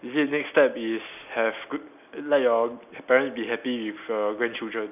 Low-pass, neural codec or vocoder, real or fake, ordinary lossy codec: 3.6 kHz; none; real; none